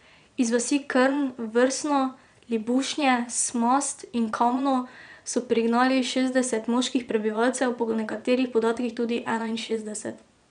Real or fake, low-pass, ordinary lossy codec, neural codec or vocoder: fake; 9.9 kHz; none; vocoder, 22.05 kHz, 80 mel bands, Vocos